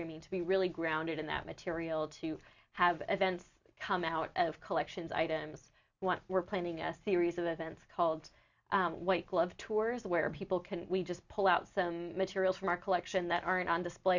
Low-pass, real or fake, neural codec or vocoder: 7.2 kHz; real; none